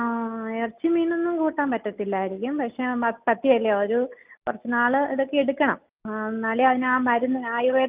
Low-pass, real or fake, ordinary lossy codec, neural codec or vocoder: 3.6 kHz; real; Opus, 24 kbps; none